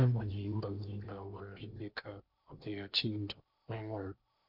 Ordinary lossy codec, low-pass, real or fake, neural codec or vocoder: MP3, 48 kbps; 5.4 kHz; fake; codec, 16 kHz in and 24 kHz out, 0.8 kbps, FocalCodec, streaming, 65536 codes